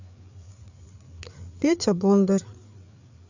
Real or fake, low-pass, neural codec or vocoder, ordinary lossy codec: fake; 7.2 kHz; codec, 16 kHz, 4 kbps, FreqCodec, larger model; none